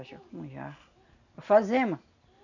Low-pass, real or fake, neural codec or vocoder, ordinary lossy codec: 7.2 kHz; real; none; none